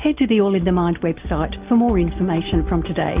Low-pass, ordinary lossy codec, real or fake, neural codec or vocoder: 3.6 kHz; Opus, 32 kbps; real; none